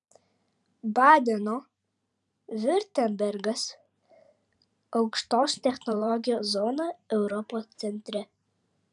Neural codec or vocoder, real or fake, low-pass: vocoder, 44.1 kHz, 128 mel bands every 512 samples, BigVGAN v2; fake; 10.8 kHz